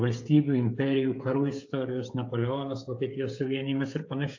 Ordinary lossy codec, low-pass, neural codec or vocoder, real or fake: AAC, 48 kbps; 7.2 kHz; codec, 16 kHz, 8 kbps, FreqCodec, smaller model; fake